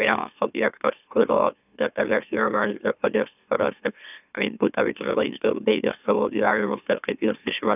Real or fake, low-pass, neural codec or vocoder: fake; 3.6 kHz; autoencoder, 44.1 kHz, a latent of 192 numbers a frame, MeloTTS